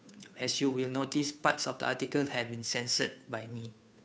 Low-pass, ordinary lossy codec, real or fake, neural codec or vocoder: none; none; fake; codec, 16 kHz, 2 kbps, FunCodec, trained on Chinese and English, 25 frames a second